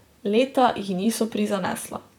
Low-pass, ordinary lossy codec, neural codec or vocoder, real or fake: 19.8 kHz; none; vocoder, 44.1 kHz, 128 mel bands, Pupu-Vocoder; fake